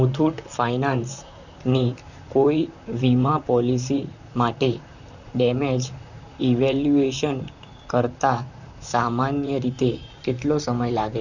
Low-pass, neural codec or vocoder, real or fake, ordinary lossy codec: 7.2 kHz; vocoder, 44.1 kHz, 128 mel bands, Pupu-Vocoder; fake; none